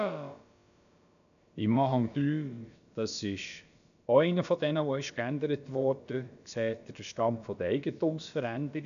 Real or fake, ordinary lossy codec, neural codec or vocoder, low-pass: fake; none; codec, 16 kHz, about 1 kbps, DyCAST, with the encoder's durations; 7.2 kHz